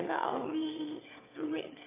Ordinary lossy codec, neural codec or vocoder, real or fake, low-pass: AAC, 24 kbps; autoencoder, 22.05 kHz, a latent of 192 numbers a frame, VITS, trained on one speaker; fake; 3.6 kHz